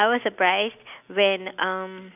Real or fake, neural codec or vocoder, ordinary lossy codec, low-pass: real; none; none; 3.6 kHz